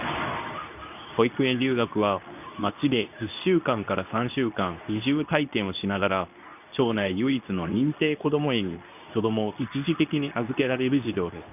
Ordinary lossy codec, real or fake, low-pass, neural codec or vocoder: none; fake; 3.6 kHz; codec, 24 kHz, 0.9 kbps, WavTokenizer, medium speech release version 2